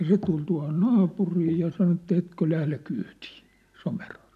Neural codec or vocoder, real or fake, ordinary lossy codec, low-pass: none; real; none; 14.4 kHz